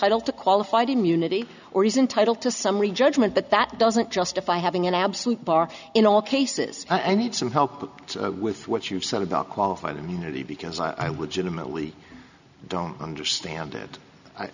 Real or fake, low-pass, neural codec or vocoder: real; 7.2 kHz; none